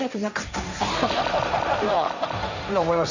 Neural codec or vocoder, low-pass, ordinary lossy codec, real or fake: codec, 16 kHz, 1.1 kbps, Voila-Tokenizer; 7.2 kHz; none; fake